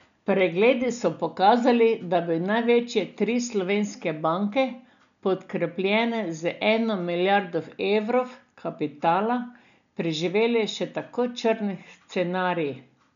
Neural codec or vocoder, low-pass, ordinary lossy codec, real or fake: none; 7.2 kHz; none; real